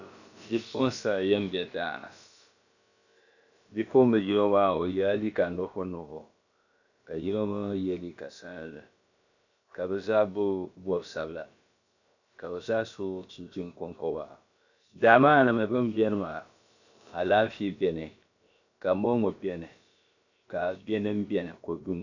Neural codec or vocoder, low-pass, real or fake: codec, 16 kHz, about 1 kbps, DyCAST, with the encoder's durations; 7.2 kHz; fake